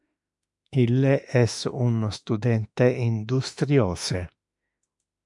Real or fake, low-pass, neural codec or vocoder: fake; 10.8 kHz; autoencoder, 48 kHz, 32 numbers a frame, DAC-VAE, trained on Japanese speech